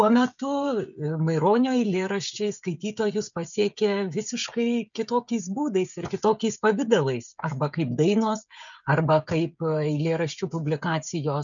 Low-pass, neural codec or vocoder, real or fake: 7.2 kHz; codec, 16 kHz, 16 kbps, FreqCodec, smaller model; fake